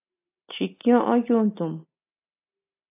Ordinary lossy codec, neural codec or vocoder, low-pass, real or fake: AAC, 24 kbps; none; 3.6 kHz; real